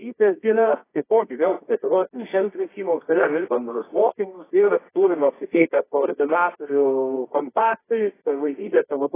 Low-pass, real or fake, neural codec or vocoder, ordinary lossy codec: 3.6 kHz; fake; codec, 24 kHz, 0.9 kbps, WavTokenizer, medium music audio release; AAC, 16 kbps